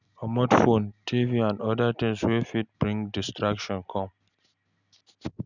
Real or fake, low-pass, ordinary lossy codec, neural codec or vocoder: real; 7.2 kHz; none; none